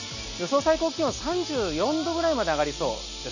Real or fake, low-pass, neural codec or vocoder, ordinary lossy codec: real; 7.2 kHz; none; none